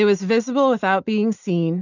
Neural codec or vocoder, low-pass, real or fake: none; 7.2 kHz; real